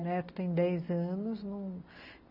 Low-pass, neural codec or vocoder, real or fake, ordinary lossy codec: 5.4 kHz; none; real; none